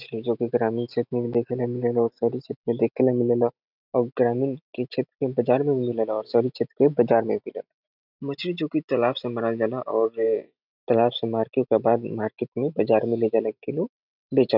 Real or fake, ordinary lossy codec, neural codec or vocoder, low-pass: real; none; none; 5.4 kHz